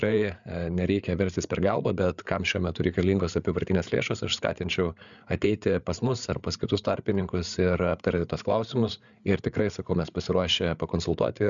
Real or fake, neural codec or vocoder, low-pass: fake; codec, 16 kHz, 8 kbps, FreqCodec, larger model; 7.2 kHz